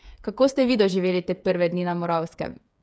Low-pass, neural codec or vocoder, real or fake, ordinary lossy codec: none; codec, 16 kHz, 8 kbps, FreqCodec, smaller model; fake; none